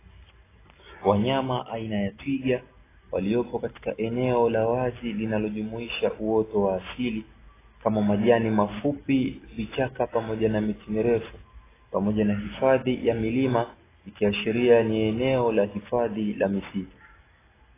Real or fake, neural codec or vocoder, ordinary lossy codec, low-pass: real; none; AAC, 16 kbps; 3.6 kHz